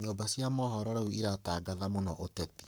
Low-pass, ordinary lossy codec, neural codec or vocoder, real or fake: none; none; codec, 44.1 kHz, 7.8 kbps, Pupu-Codec; fake